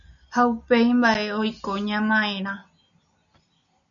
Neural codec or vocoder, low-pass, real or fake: none; 7.2 kHz; real